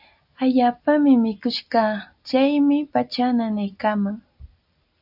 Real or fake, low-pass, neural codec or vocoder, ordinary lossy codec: real; 5.4 kHz; none; AAC, 48 kbps